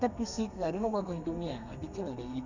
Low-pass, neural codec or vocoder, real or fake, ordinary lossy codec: 7.2 kHz; codec, 32 kHz, 1.9 kbps, SNAC; fake; none